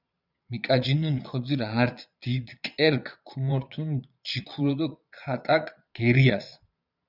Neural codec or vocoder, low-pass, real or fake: vocoder, 22.05 kHz, 80 mel bands, Vocos; 5.4 kHz; fake